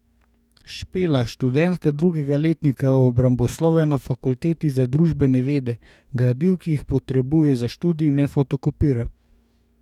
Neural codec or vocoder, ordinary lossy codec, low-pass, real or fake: codec, 44.1 kHz, 2.6 kbps, DAC; none; 19.8 kHz; fake